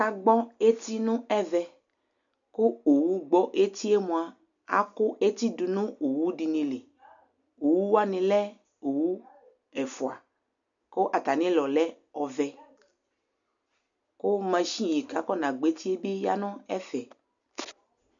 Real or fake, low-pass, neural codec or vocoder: real; 7.2 kHz; none